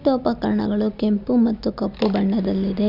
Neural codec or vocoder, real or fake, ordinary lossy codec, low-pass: none; real; none; 5.4 kHz